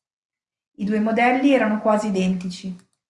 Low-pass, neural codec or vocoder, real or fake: 10.8 kHz; none; real